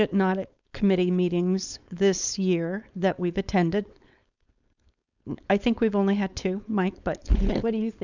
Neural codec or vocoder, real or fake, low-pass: codec, 16 kHz, 4.8 kbps, FACodec; fake; 7.2 kHz